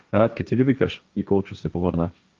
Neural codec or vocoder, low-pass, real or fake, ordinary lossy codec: codec, 16 kHz, 1.1 kbps, Voila-Tokenizer; 7.2 kHz; fake; Opus, 32 kbps